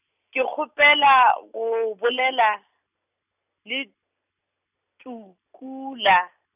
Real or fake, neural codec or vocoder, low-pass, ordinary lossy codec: real; none; 3.6 kHz; none